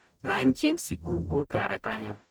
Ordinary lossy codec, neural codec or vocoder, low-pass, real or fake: none; codec, 44.1 kHz, 0.9 kbps, DAC; none; fake